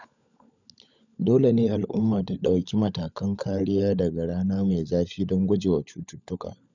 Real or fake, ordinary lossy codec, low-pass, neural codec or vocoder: fake; none; 7.2 kHz; codec, 16 kHz, 16 kbps, FunCodec, trained on LibriTTS, 50 frames a second